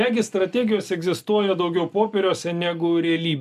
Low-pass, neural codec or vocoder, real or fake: 14.4 kHz; none; real